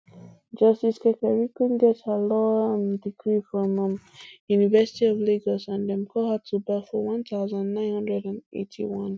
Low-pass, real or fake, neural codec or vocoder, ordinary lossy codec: none; real; none; none